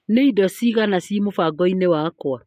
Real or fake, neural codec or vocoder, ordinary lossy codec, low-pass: real; none; MP3, 64 kbps; 14.4 kHz